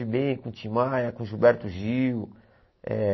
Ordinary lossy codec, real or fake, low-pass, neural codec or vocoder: MP3, 24 kbps; real; 7.2 kHz; none